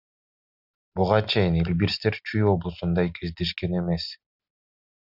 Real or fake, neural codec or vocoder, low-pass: real; none; 5.4 kHz